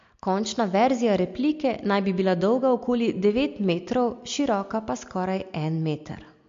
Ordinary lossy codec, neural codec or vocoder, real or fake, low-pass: MP3, 48 kbps; none; real; 7.2 kHz